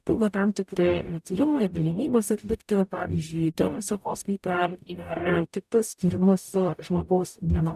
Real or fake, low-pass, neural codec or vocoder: fake; 14.4 kHz; codec, 44.1 kHz, 0.9 kbps, DAC